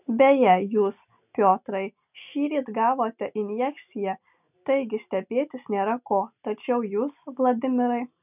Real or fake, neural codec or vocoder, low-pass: real; none; 3.6 kHz